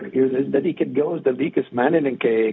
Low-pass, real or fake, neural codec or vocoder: 7.2 kHz; fake; codec, 16 kHz, 0.4 kbps, LongCat-Audio-Codec